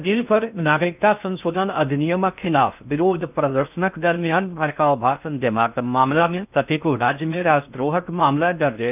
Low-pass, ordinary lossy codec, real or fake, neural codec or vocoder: 3.6 kHz; none; fake; codec, 16 kHz in and 24 kHz out, 0.6 kbps, FocalCodec, streaming, 2048 codes